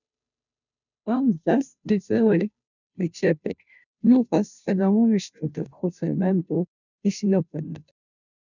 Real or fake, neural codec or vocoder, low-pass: fake; codec, 16 kHz, 0.5 kbps, FunCodec, trained on Chinese and English, 25 frames a second; 7.2 kHz